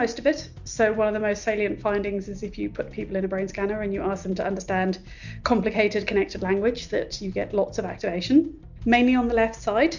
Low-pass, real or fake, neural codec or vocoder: 7.2 kHz; real; none